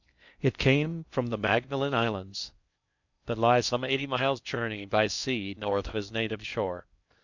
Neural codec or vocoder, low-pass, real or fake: codec, 16 kHz in and 24 kHz out, 0.6 kbps, FocalCodec, streaming, 2048 codes; 7.2 kHz; fake